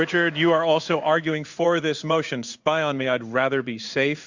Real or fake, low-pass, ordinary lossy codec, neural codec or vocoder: fake; 7.2 kHz; Opus, 64 kbps; codec, 16 kHz in and 24 kHz out, 1 kbps, XY-Tokenizer